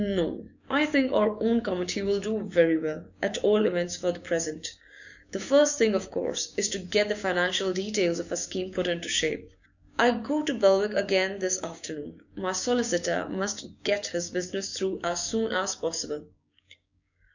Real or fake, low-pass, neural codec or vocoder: fake; 7.2 kHz; codec, 16 kHz, 6 kbps, DAC